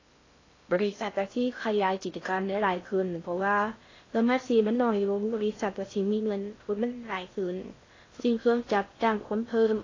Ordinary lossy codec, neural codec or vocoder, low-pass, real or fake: AAC, 32 kbps; codec, 16 kHz in and 24 kHz out, 0.6 kbps, FocalCodec, streaming, 4096 codes; 7.2 kHz; fake